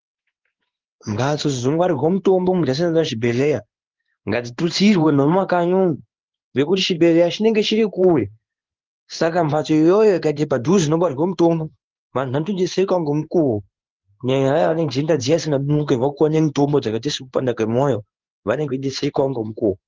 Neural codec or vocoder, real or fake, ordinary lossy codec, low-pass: codec, 16 kHz in and 24 kHz out, 1 kbps, XY-Tokenizer; fake; Opus, 32 kbps; 7.2 kHz